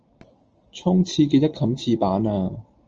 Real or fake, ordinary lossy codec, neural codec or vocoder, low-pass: real; Opus, 24 kbps; none; 7.2 kHz